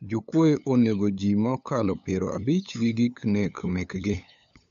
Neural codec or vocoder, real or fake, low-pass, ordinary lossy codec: codec, 16 kHz, 8 kbps, FunCodec, trained on LibriTTS, 25 frames a second; fake; 7.2 kHz; none